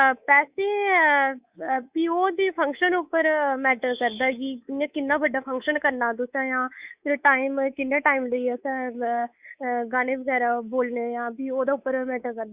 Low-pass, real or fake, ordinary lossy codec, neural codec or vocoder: 3.6 kHz; fake; Opus, 64 kbps; codec, 24 kHz, 3.1 kbps, DualCodec